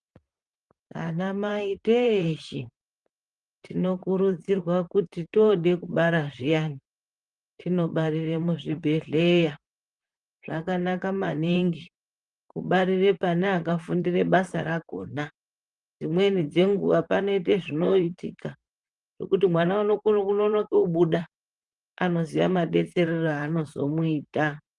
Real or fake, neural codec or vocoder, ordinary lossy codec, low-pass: fake; vocoder, 44.1 kHz, 128 mel bands, Pupu-Vocoder; Opus, 32 kbps; 10.8 kHz